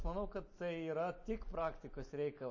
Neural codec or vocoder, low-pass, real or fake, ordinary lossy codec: none; 7.2 kHz; real; MP3, 32 kbps